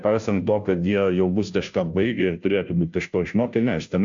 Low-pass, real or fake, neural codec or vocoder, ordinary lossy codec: 7.2 kHz; fake; codec, 16 kHz, 0.5 kbps, FunCodec, trained on Chinese and English, 25 frames a second; AAC, 64 kbps